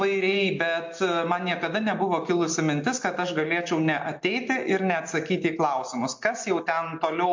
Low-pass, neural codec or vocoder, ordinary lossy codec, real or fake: 7.2 kHz; none; MP3, 48 kbps; real